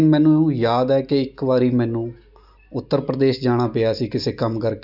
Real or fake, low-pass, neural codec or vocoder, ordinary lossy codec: real; 5.4 kHz; none; none